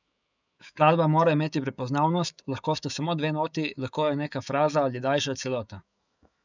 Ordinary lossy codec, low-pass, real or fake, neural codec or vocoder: none; 7.2 kHz; fake; autoencoder, 48 kHz, 128 numbers a frame, DAC-VAE, trained on Japanese speech